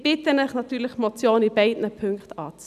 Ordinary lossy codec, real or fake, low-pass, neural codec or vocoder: none; real; 14.4 kHz; none